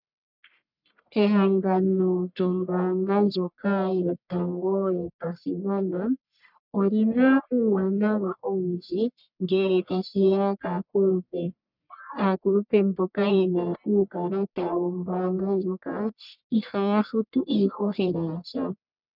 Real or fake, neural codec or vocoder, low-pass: fake; codec, 44.1 kHz, 1.7 kbps, Pupu-Codec; 5.4 kHz